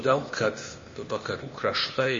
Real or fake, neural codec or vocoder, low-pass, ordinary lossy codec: fake; codec, 16 kHz, 0.8 kbps, ZipCodec; 7.2 kHz; MP3, 32 kbps